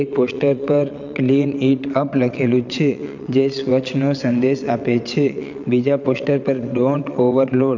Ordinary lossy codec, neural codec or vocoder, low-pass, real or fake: none; vocoder, 22.05 kHz, 80 mel bands, WaveNeXt; 7.2 kHz; fake